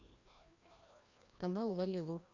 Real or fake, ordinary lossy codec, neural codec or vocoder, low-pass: fake; Opus, 64 kbps; codec, 16 kHz, 1 kbps, FreqCodec, larger model; 7.2 kHz